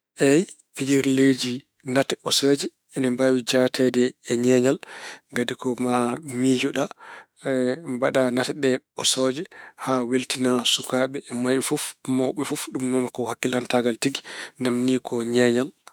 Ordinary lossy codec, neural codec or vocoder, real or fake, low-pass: none; autoencoder, 48 kHz, 32 numbers a frame, DAC-VAE, trained on Japanese speech; fake; none